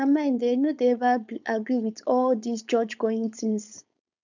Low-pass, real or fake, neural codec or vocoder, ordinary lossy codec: 7.2 kHz; fake; codec, 16 kHz, 4.8 kbps, FACodec; none